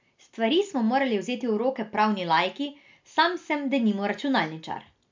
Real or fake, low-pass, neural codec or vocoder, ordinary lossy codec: real; 7.2 kHz; none; MP3, 64 kbps